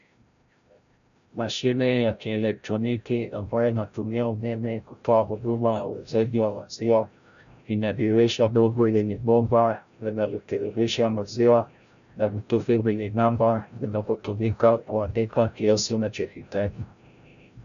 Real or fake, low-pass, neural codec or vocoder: fake; 7.2 kHz; codec, 16 kHz, 0.5 kbps, FreqCodec, larger model